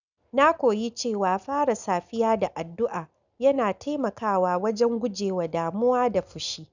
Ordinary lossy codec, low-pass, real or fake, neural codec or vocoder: none; 7.2 kHz; real; none